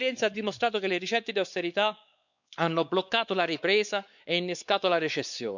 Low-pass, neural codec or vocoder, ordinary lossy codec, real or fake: 7.2 kHz; codec, 16 kHz, 4 kbps, X-Codec, WavLM features, trained on Multilingual LibriSpeech; none; fake